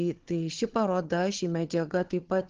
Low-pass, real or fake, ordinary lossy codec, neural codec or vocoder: 7.2 kHz; fake; Opus, 16 kbps; codec, 16 kHz, 4 kbps, FunCodec, trained on Chinese and English, 50 frames a second